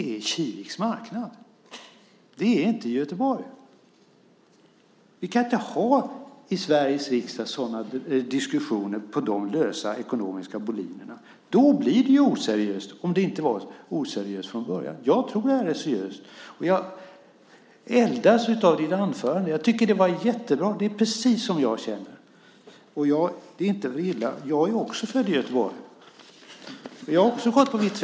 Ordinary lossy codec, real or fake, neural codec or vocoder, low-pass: none; real; none; none